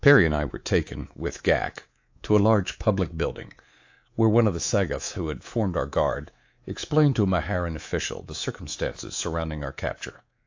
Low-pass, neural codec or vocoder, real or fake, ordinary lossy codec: 7.2 kHz; codec, 24 kHz, 3.1 kbps, DualCodec; fake; AAC, 48 kbps